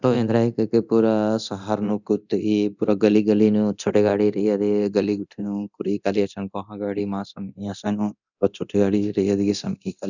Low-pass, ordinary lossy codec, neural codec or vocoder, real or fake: 7.2 kHz; none; codec, 24 kHz, 0.9 kbps, DualCodec; fake